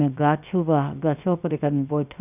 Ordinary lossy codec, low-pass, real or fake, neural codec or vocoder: none; 3.6 kHz; fake; codec, 16 kHz, 0.7 kbps, FocalCodec